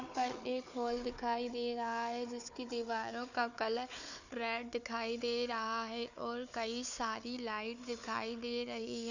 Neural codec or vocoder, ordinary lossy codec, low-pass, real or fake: codec, 16 kHz, 4 kbps, FunCodec, trained on Chinese and English, 50 frames a second; none; 7.2 kHz; fake